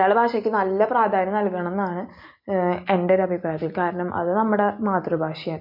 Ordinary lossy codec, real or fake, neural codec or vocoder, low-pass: MP3, 32 kbps; real; none; 5.4 kHz